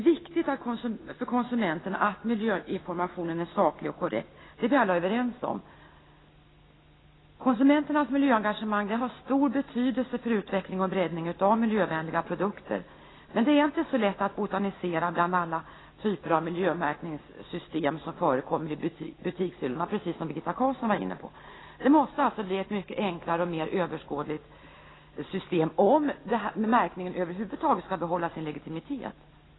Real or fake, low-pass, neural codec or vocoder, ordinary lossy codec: real; 7.2 kHz; none; AAC, 16 kbps